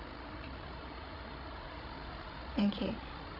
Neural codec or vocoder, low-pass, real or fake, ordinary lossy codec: codec, 16 kHz, 16 kbps, FreqCodec, larger model; 5.4 kHz; fake; none